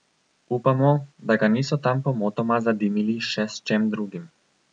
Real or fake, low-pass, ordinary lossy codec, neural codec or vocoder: real; 9.9 kHz; none; none